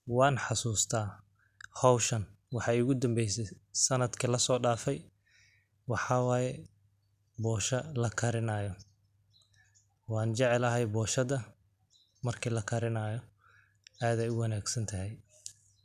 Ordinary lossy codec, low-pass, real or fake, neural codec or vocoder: none; 14.4 kHz; real; none